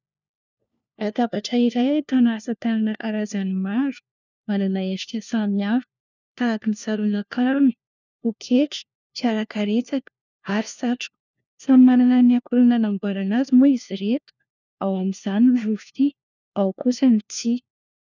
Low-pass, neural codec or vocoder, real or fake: 7.2 kHz; codec, 16 kHz, 1 kbps, FunCodec, trained on LibriTTS, 50 frames a second; fake